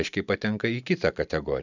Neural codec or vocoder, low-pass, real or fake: none; 7.2 kHz; real